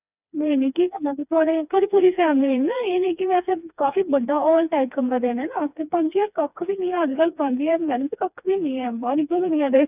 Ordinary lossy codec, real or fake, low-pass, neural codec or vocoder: none; fake; 3.6 kHz; codec, 16 kHz, 2 kbps, FreqCodec, smaller model